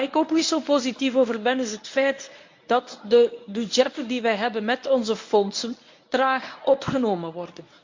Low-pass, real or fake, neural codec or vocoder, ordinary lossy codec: 7.2 kHz; fake; codec, 24 kHz, 0.9 kbps, WavTokenizer, medium speech release version 1; MP3, 48 kbps